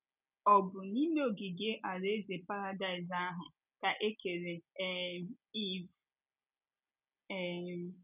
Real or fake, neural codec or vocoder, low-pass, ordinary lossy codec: real; none; 3.6 kHz; none